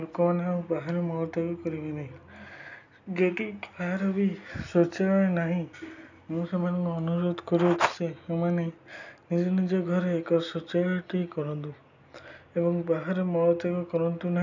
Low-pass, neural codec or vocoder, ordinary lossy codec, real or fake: 7.2 kHz; none; none; real